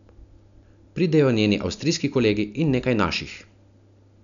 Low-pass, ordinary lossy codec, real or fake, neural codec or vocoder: 7.2 kHz; none; real; none